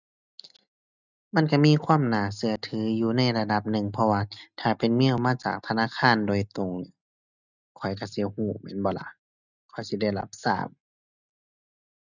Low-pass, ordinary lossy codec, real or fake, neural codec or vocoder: 7.2 kHz; none; real; none